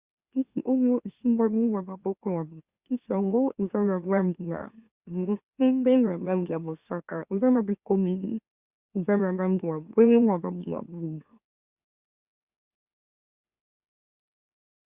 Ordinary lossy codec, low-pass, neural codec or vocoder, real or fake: Opus, 64 kbps; 3.6 kHz; autoencoder, 44.1 kHz, a latent of 192 numbers a frame, MeloTTS; fake